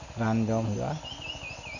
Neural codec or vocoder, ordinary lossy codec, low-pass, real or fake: none; none; 7.2 kHz; real